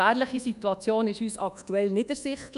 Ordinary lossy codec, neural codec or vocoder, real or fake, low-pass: none; codec, 24 kHz, 1.2 kbps, DualCodec; fake; 10.8 kHz